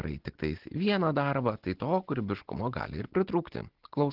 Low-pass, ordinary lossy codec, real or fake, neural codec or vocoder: 5.4 kHz; Opus, 16 kbps; real; none